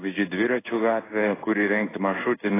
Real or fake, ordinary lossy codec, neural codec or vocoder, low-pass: fake; AAC, 16 kbps; codec, 16 kHz in and 24 kHz out, 1 kbps, XY-Tokenizer; 3.6 kHz